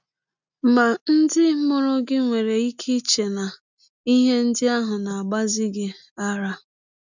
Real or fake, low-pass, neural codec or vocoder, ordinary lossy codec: real; 7.2 kHz; none; none